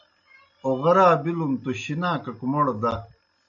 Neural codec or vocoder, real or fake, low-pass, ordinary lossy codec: none; real; 7.2 kHz; AAC, 64 kbps